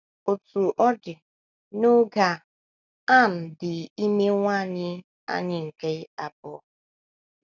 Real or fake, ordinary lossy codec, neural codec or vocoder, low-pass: real; none; none; 7.2 kHz